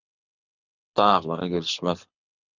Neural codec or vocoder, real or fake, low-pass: codec, 24 kHz, 6 kbps, HILCodec; fake; 7.2 kHz